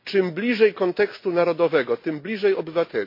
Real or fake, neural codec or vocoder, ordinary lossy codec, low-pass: real; none; MP3, 32 kbps; 5.4 kHz